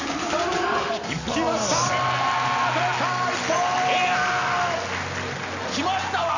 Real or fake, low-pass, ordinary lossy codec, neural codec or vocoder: real; 7.2 kHz; none; none